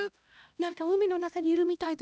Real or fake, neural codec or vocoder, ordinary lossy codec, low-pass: fake; codec, 16 kHz, 1 kbps, X-Codec, HuBERT features, trained on LibriSpeech; none; none